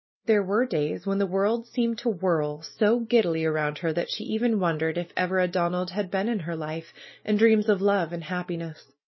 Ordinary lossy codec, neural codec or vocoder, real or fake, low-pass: MP3, 24 kbps; none; real; 7.2 kHz